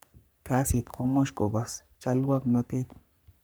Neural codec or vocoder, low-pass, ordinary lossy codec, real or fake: codec, 44.1 kHz, 3.4 kbps, Pupu-Codec; none; none; fake